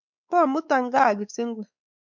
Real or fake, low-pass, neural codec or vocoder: fake; 7.2 kHz; codec, 16 kHz, 4 kbps, X-Codec, WavLM features, trained on Multilingual LibriSpeech